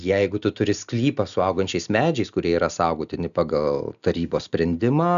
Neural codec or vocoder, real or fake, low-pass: none; real; 7.2 kHz